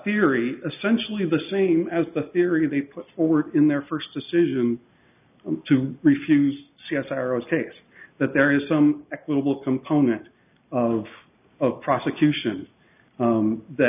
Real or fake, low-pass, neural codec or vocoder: real; 3.6 kHz; none